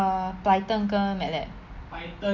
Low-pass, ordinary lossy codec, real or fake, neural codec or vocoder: 7.2 kHz; none; real; none